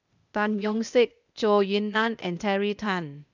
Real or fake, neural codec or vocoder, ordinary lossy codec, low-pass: fake; codec, 16 kHz, 0.8 kbps, ZipCodec; none; 7.2 kHz